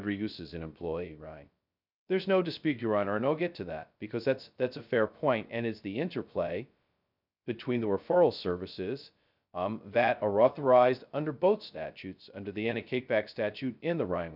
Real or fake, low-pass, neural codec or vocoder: fake; 5.4 kHz; codec, 16 kHz, 0.2 kbps, FocalCodec